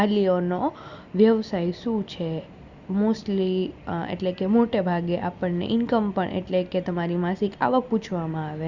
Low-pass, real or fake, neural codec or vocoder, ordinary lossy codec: 7.2 kHz; real; none; Opus, 64 kbps